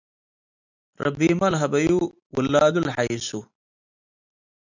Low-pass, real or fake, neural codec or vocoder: 7.2 kHz; real; none